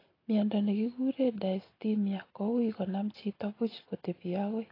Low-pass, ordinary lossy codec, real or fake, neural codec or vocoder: 5.4 kHz; AAC, 24 kbps; real; none